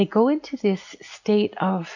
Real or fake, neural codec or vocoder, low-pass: real; none; 7.2 kHz